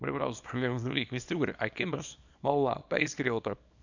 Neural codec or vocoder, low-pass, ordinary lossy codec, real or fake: codec, 24 kHz, 0.9 kbps, WavTokenizer, small release; 7.2 kHz; none; fake